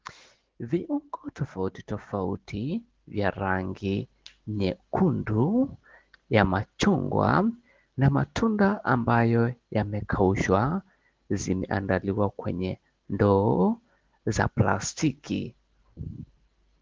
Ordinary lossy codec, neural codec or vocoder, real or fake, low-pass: Opus, 16 kbps; none; real; 7.2 kHz